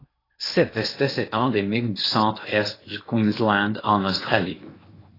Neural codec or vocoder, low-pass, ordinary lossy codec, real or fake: codec, 16 kHz in and 24 kHz out, 0.8 kbps, FocalCodec, streaming, 65536 codes; 5.4 kHz; AAC, 24 kbps; fake